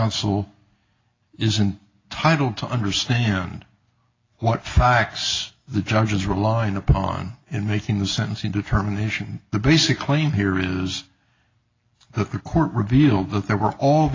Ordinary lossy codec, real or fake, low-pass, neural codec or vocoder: AAC, 32 kbps; real; 7.2 kHz; none